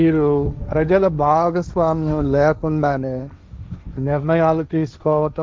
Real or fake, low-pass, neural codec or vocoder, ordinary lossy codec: fake; none; codec, 16 kHz, 1.1 kbps, Voila-Tokenizer; none